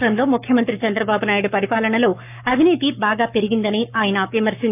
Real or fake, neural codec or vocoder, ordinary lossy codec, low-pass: fake; codec, 44.1 kHz, 7.8 kbps, Pupu-Codec; none; 3.6 kHz